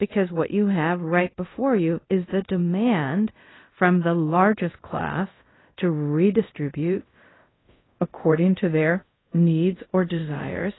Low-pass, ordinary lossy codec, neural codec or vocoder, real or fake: 7.2 kHz; AAC, 16 kbps; codec, 24 kHz, 0.5 kbps, DualCodec; fake